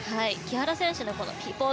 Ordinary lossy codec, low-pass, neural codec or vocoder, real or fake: none; none; none; real